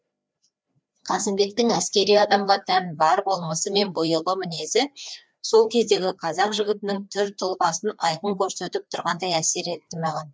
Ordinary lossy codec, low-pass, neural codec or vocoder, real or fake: none; none; codec, 16 kHz, 2 kbps, FreqCodec, larger model; fake